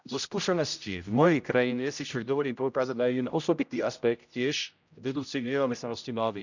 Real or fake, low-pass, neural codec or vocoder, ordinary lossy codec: fake; 7.2 kHz; codec, 16 kHz, 0.5 kbps, X-Codec, HuBERT features, trained on general audio; none